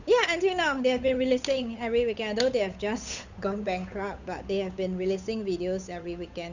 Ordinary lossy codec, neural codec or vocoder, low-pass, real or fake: Opus, 64 kbps; codec, 16 kHz, 8 kbps, FunCodec, trained on Chinese and English, 25 frames a second; 7.2 kHz; fake